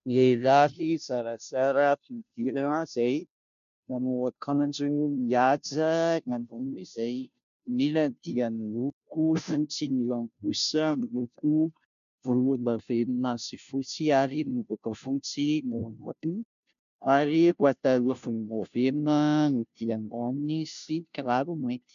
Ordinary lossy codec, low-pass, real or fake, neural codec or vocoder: MP3, 64 kbps; 7.2 kHz; fake; codec, 16 kHz, 0.5 kbps, FunCodec, trained on Chinese and English, 25 frames a second